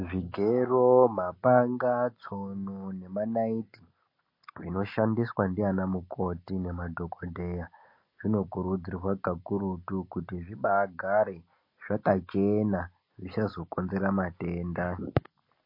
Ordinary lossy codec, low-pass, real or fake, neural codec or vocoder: MP3, 32 kbps; 5.4 kHz; real; none